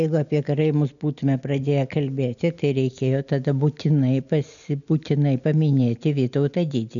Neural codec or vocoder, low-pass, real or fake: none; 7.2 kHz; real